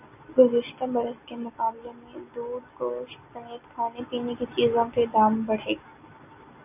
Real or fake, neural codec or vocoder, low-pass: real; none; 3.6 kHz